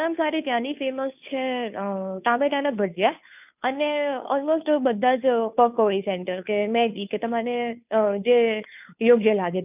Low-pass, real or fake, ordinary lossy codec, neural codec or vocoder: 3.6 kHz; fake; none; codec, 16 kHz, 2 kbps, FunCodec, trained on Chinese and English, 25 frames a second